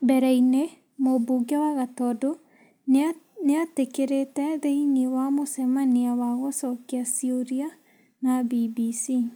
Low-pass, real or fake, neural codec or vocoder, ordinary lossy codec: none; real; none; none